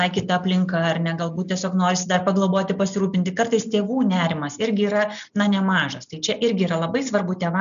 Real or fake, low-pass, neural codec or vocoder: real; 7.2 kHz; none